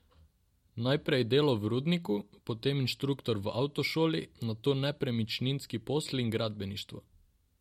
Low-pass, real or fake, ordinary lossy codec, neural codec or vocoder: 19.8 kHz; real; MP3, 64 kbps; none